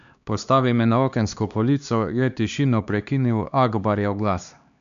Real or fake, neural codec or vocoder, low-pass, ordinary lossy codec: fake; codec, 16 kHz, 2 kbps, X-Codec, HuBERT features, trained on LibriSpeech; 7.2 kHz; none